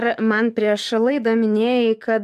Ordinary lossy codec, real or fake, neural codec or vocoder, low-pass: MP3, 96 kbps; fake; codec, 44.1 kHz, 7.8 kbps, DAC; 14.4 kHz